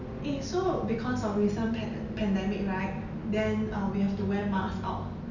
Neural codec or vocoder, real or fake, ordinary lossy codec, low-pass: none; real; none; 7.2 kHz